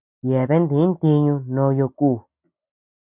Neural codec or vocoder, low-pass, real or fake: none; 3.6 kHz; real